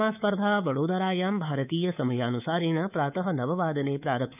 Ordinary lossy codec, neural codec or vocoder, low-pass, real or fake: none; codec, 24 kHz, 3.1 kbps, DualCodec; 3.6 kHz; fake